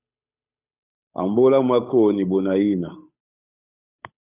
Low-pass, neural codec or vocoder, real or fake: 3.6 kHz; codec, 16 kHz, 8 kbps, FunCodec, trained on Chinese and English, 25 frames a second; fake